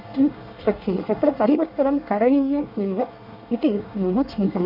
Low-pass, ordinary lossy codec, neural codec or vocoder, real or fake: 5.4 kHz; none; codec, 24 kHz, 1 kbps, SNAC; fake